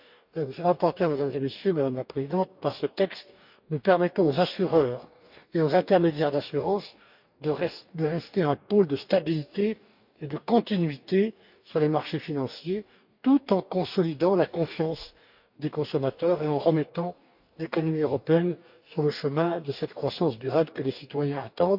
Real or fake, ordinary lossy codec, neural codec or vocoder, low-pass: fake; none; codec, 44.1 kHz, 2.6 kbps, DAC; 5.4 kHz